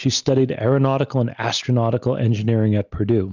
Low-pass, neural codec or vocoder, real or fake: 7.2 kHz; none; real